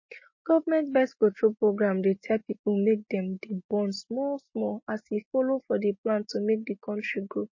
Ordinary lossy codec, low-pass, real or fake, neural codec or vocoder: MP3, 32 kbps; 7.2 kHz; real; none